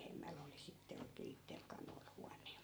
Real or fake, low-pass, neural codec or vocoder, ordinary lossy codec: fake; none; vocoder, 44.1 kHz, 128 mel bands every 512 samples, BigVGAN v2; none